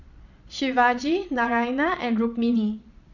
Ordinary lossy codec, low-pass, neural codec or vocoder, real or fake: none; 7.2 kHz; vocoder, 22.05 kHz, 80 mel bands, Vocos; fake